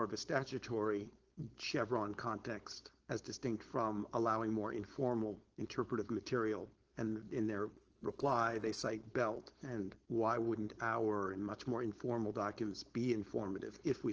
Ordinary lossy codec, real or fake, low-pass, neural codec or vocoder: Opus, 16 kbps; fake; 7.2 kHz; codec, 16 kHz, 4.8 kbps, FACodec